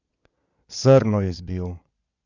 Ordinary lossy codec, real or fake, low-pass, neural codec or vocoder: none; fake; 7.2 kHz; vocoder, 22.05 kHz, 80 mel bands, Vocos